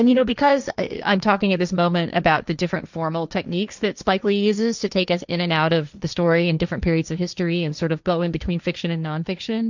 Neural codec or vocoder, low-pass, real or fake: codec, 16 kHz, 1.1 kbps, Voila-Tokenizer; 7.2 kHz; fake